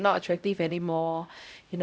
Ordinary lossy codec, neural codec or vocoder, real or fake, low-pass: none; codec, 16 kHz, 0.5 kbps, X-Codec, HuBERT features, trained on LibriSpeech; fake; none